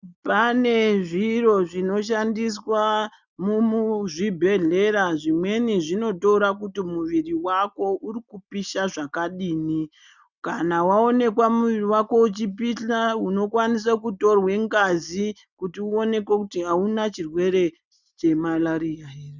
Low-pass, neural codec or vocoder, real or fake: 7.2 kHz; none; real